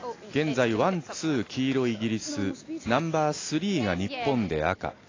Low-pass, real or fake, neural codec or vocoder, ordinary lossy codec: 7.2 kHz; real; none; AAC, 32 kbps